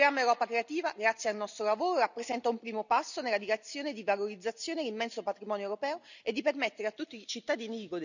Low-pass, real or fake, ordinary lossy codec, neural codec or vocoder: 7.2 kHz; real; none; none